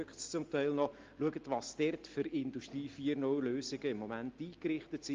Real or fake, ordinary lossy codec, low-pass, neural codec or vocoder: real; Opus, 32 kbps; 7.2 kHz; none